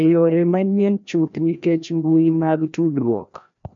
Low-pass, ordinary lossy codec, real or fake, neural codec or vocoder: 7.2 kHz; MP3, 64 kbps; fake; codec, 16 kHz, 1 kbps, FreqCodec, larger model